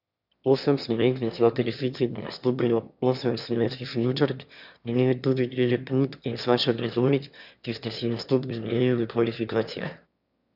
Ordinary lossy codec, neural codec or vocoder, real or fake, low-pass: none; autoencoder, 22.05 kHz, a latent of 192 numbers a frame, VITS, trained on one speaker; fake; 5.4 kHz